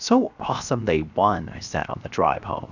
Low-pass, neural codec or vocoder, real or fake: 7.2 kHz; codec, 16 kHz, 0.7 kbps, FocalCodec; fake